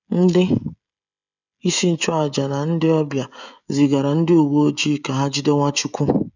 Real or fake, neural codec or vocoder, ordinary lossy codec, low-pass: fake; codec, 16 kHz, 16 kbps, FreqCodec, smaller model; none; 7.2 kHz